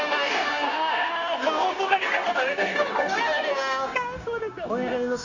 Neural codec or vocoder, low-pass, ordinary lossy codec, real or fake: codec, 16 kHz, 0.9 kbps, LongCat-Audio-Codec; 7.2 kHz; none; fake